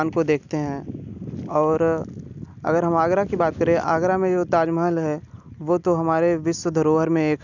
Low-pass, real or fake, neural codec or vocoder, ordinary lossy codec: 7.2 kHz; real; none; none